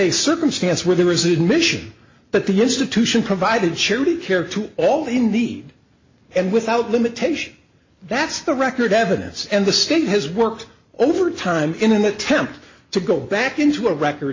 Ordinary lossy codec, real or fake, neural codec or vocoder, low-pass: MP3, 32 kbps; real; none; 7.2 kHz